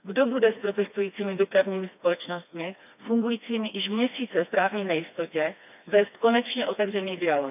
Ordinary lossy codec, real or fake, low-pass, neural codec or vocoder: none; fake; 3.6 kHz; codec, 16 kHz, 2 kbps, FreqCodec, smaller model